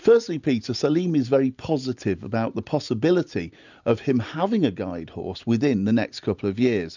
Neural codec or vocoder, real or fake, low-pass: none; real; 7.2 kHz